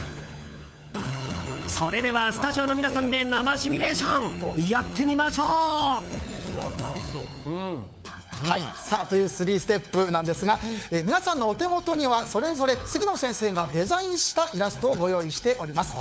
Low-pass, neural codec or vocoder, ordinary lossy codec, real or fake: none; codec, 16 kHz, 4 kbps, FunCodec, trained on LibriTTS, 50 frames a second; none; fake